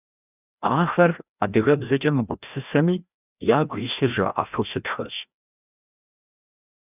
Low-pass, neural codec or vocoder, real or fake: 3.6 kHz; codec, 16 kHz, 1 kbps, FreqCodec, larger model; fake